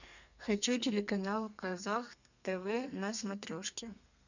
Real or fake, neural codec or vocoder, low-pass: fake; codec, 32 kHz, 1.9 kbps, SNAC; 7.2 kHz